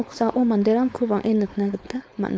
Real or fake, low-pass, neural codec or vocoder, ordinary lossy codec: fake; none; codec, 16 kHz, 4.8 kbps, FACodec; none